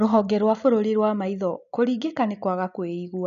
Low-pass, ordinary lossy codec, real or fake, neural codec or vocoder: 7.2 kHz; none; real; none